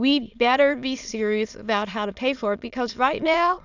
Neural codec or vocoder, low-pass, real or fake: autoencoder, 22.05 kHz, a latent of 192 numbers a frame, VITS, trained on many speakers; 7.2 kHz; fake